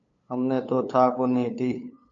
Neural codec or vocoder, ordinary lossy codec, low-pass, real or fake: codec, 16 kHz, 8 kbps, FunCodec, trained on LibriTTS, 25 frames a second; MP3, 64 kbps; 7.2 kHz; fake